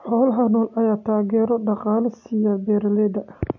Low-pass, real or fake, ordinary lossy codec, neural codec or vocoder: 7.2 kHz; real; MP3, 48 kbps; none